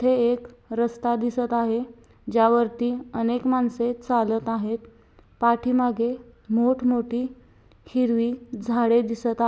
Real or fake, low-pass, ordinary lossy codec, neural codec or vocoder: real; none; none; none